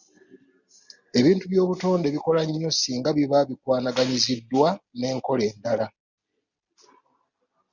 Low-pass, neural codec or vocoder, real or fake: 7.2 kHz; none; real